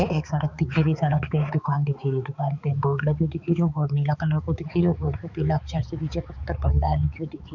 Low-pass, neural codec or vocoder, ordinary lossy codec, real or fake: 7.2 kHz; codec, 16 kHz, 4 kbps, X-Codec, HuBERT features, trained on balanced general audio; none; fake